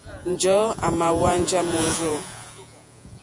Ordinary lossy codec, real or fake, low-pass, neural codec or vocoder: MP3, 64 kbps; fake; 10.8 kHz; vocoder, 48 kHz, 128 mel bands, Vocos